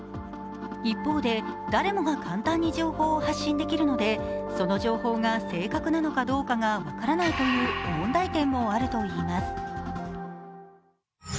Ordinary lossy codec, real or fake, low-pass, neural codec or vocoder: none; real; none; none